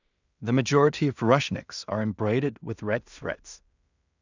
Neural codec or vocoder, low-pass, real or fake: codec, 16 kHz in and 24 kHz out, 0.4 kbps, LongCat-Audio-Codec, two codebook decoder; 7.2 kHz; fake